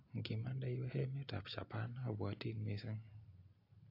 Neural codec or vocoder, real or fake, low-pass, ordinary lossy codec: none; real; 5.4 kHz; AAC, 48 kbps